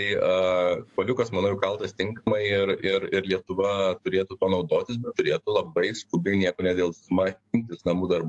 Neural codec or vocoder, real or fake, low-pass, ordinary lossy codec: codec, 44.1 kHz, 7.8 kbps, DAC; fake; 10.8 kHz; AAC, 64 kbps